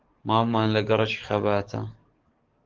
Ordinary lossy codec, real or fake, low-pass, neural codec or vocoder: Opus, 16 kbps; fake; 7.2 kHz; codec, 44.1 kHz, 7.8 kbps, DAC